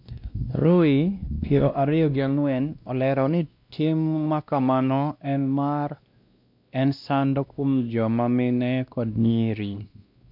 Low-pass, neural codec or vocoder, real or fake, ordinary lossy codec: 5.4 kHz; codec, 16 kHz, 1 kbps, X-Codec, WavLM features, trained on Multilingual LibriSpeech; fake; MP3, 48 kbps